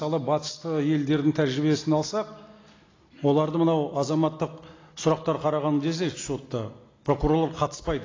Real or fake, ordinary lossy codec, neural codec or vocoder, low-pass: real; AAC, 32 kbps; none; 7.2 kHz